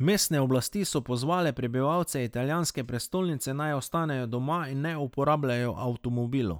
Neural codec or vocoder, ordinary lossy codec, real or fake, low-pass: none; none; real; none